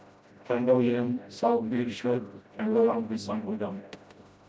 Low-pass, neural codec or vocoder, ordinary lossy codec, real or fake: none; codec, 16 kHz, 0.5 kbps, FreqCodec, smaller model; none; fake